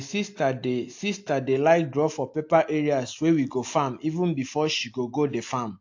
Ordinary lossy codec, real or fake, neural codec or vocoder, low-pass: AAC, 48 kbps; real; none; 7.2 kHz